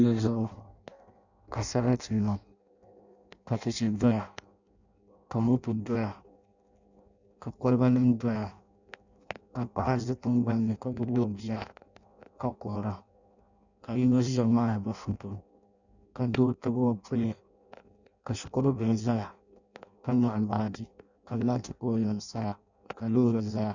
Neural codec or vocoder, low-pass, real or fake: codec, 16 kHz in and 24 kHz out, 0.6 kbps, FireRedTTS-2 codec; 7.2 kHz; fake